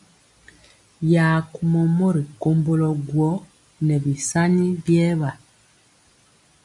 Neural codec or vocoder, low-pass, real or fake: none; 10.8 kHz; real